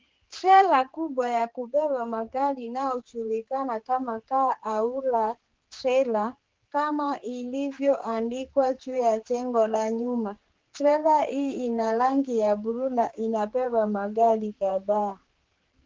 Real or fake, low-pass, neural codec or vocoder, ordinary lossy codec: fake; 7.2 kHz; codec, 16 kHz, 4 kbps, X-Codec, HuBERT features, trained on general audio; Opus, 16 kbps